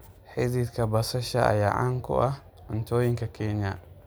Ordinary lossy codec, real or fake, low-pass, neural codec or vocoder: none; real; none; none